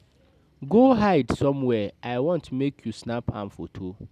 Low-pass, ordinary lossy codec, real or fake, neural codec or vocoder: 14.4 kHz; none; real; none